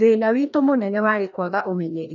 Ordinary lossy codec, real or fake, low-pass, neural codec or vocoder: none; fake; 7.2 kHz; codec, 16 kHz, 1 kbps, FreqCodec, larger model